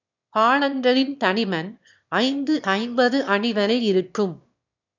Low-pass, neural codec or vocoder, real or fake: 7.2 kHz; autoencoder, 22.05 kHz, a latent of 192 numbers a frame, VITS, trained on one speaker; fake